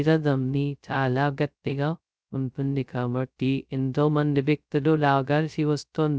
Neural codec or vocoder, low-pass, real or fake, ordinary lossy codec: codec, 16 kHz, 0.2 kbps, FocalCodec; none; fake; none